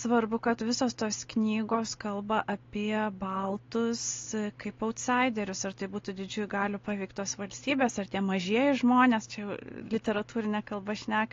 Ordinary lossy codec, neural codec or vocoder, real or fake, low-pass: AAC, 32 kbps; none; real; 7.2 kHz